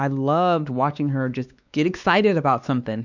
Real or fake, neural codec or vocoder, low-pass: fake; codec, 16 kHz, 2 kbps, X-Codec, WavLM features, trained on Multilingual LibriSpeech; 7.2 kHz